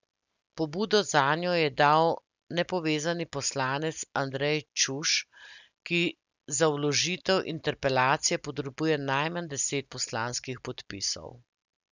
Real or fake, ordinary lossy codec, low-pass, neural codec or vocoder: real; none; 7.2 kHz; none